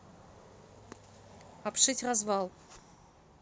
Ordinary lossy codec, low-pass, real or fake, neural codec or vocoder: none; none; real; none